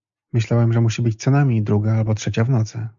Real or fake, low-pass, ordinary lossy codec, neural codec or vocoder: real; 7.2 kHz; MP3, 64 kbps; none